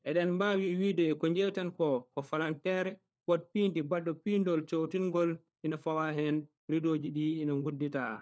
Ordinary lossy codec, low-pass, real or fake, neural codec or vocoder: none; none; fake; codec, 16 kHz, 4 kbps, FunCodec, trained on LibriTTS, 50 frames a second